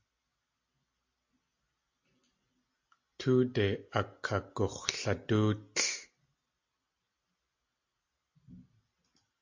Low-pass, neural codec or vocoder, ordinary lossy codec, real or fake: 7.2 kHz; none; MP3, 48 kbps; real